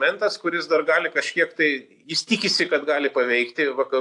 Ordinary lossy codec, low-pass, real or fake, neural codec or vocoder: AAC, 64 kbps; 10.8 kHz; real; none